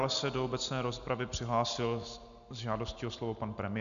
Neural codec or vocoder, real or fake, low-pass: none; real; 7.2 kHz